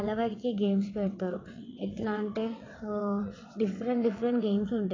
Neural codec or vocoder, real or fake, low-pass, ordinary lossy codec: vocoder, 22.05 kHz, 80 mel bands, WaveNeXt; fake; 7.2 kHz; AAC, 32 kbps